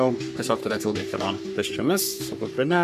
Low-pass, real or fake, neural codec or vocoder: 14.4 kHz; fake; codec, 44.1 kHz, 3.4 kbps, Pupu-Codec